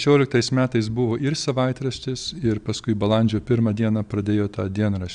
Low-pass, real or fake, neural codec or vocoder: 9.9 kHz; real; none